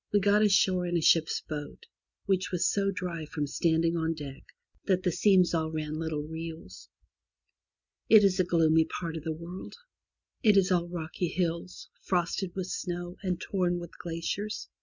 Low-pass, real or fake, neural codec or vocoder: 7.2 kHz; real; none